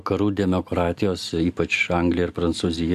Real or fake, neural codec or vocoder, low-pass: real; none; 14.4 kHz